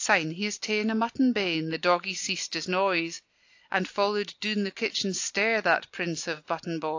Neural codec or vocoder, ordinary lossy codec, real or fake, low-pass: none; AAC, 48 kbps; real; 7.2 kHz